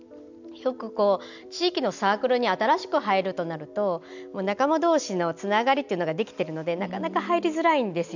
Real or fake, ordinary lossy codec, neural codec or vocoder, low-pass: real; none; none; 7.2 kHz